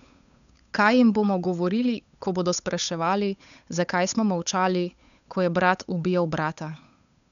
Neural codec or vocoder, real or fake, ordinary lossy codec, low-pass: codec, 16 kHz, 8 kbps, FunCodec, trained on Chinese and English, 25 frames a second; fake; none; 7.2 kHz